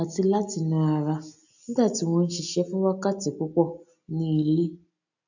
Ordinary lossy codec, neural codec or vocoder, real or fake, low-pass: none; none; real; 7.2 kHz